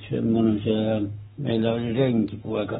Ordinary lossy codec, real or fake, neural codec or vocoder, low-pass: AAC, 16 kbps; fake; codec, 44.1 kHz, 7.8 kbps, Pupu-Codec; 19.8 kHz